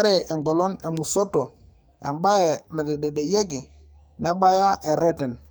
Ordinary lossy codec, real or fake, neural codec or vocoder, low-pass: none; fake; codec, 44.1 kHz, 2.6 kbps, SNAC; none